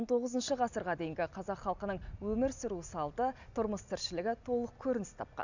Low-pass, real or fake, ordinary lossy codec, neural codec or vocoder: 7.2 kHz; real; none; none